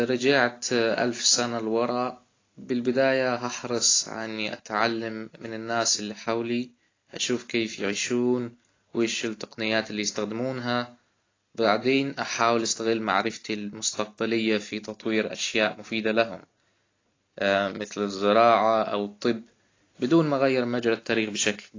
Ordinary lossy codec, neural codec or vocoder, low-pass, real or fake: AAC, 32 kbps; none; 7.2 kHz; real